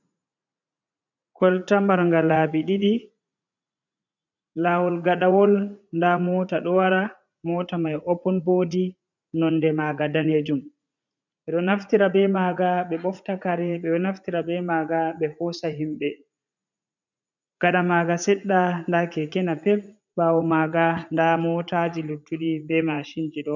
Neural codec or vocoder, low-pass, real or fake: vocoder, 44.1 kHz, 80 mel bands, Vocos; 7.2 kHz; fake